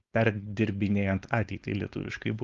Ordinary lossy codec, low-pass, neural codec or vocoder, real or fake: Opus, 32 kbps; 7.2 kHz; codec, 16 kHz, 4.8 kbps, FACodec; fake